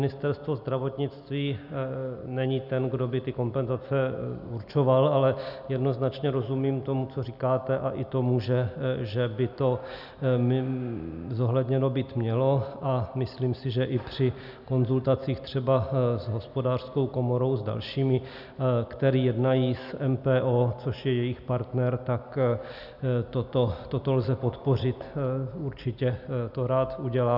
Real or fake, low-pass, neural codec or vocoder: real; 5.4 kHz; none